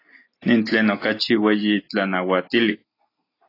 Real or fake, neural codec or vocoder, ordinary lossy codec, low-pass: real; none; AAC, 24 kbps; 5.4 kHz